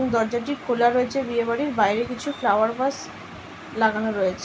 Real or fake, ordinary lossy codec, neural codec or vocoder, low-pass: real; none; none; none